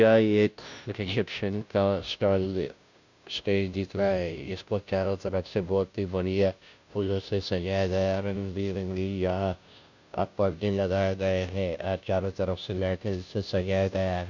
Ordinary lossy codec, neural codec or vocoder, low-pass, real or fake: none; codec, 16 kHz, 0.5 kbps, FunCodec, trained on Chinese and English, 25 frames a second; 7.2 kHz; fake